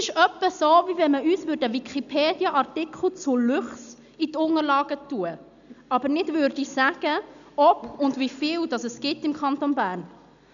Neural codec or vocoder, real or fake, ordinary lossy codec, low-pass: none; real; none; 7.2 kHz